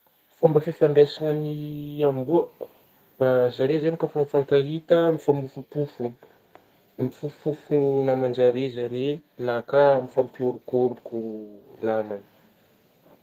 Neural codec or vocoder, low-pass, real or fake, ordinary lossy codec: codec, 32 kHz, 1.9 kbps, SNAC; 14.4 kHz; fake; Opus, 32 kbps